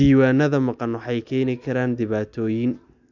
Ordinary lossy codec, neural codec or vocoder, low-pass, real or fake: none; none; 7.2 kHz; real